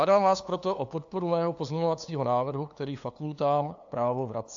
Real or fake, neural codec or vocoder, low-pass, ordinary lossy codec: fake; codec, 16 kHz, 2 kbps, FunCodec, trained on LibriTTS, 25 frames a second; 7.2 kHz; AAC, 64 kbps